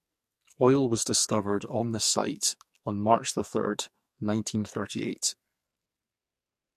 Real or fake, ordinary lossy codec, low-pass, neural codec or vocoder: fake; MP3, 64 kbps; 14.4 kHz; codec, 44.1 kHz, 2.6 kbps, SNAC